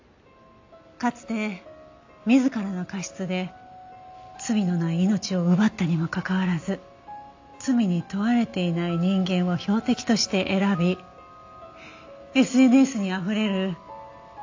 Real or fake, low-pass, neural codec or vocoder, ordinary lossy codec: real; 7.2 kHz; none; AAC, 48 kbps